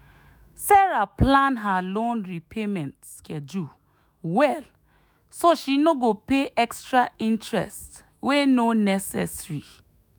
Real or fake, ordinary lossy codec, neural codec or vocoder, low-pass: fake; none; autoencoder, 48 kHz, 128 numbers a frame, DAC-VAE, trained on Japanese speech; none